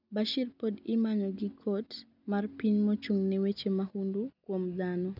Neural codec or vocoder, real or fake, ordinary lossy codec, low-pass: none; real; none; 5.4 kHz